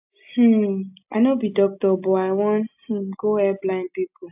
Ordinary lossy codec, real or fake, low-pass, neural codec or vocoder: AAC, 32 kbps; real; 3.6 kHz; none